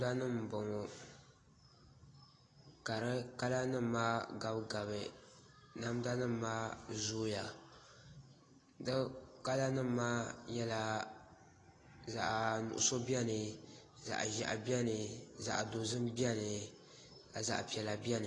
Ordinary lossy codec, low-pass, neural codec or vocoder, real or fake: AAC, 32 kbps; 10.8 kHz; none; real